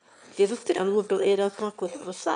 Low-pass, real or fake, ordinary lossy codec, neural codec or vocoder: 9.9 kHz; fake; none; autoencoder, 22.05 kHz, a latent of 192 numbers a frame, VITS, trained on one speaker